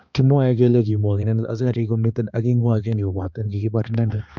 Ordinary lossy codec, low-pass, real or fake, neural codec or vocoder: MP3, 64 kbps; 7.2 kHz; fake; codec, 16 kHz, 2 kbps, X-Codec, HuBERT features, trained on balanced general audio